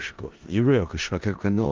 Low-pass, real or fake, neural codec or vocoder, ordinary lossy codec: 7.2 kHz; fake; codec, 16 kHz in and 24 kHz out, 0.4 kbps, LongCat-Audio-Codec, four codebook decoder; Opus, 32 kbps